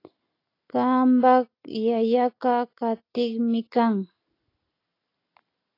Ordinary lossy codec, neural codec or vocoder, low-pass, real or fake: AAC, 32 kbps; none; 5.4 kHz; real